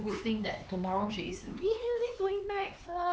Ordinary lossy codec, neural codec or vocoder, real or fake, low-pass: none; codec, 16 kHz, 4 kbps, X-Codec, HuBERT features, trained on LibriSpeech; fake; none